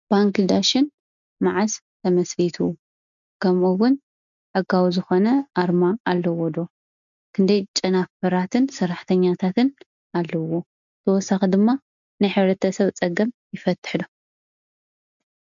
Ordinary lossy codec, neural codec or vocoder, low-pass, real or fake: MP3, 96 kbps; none; 7.2 kHz; real